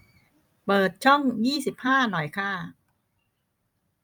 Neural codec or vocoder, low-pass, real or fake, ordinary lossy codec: vocoder, 44.1 kHz, 128 mel bands every 256 samples, BigVGAN v2; 19.8 kHz; fake; none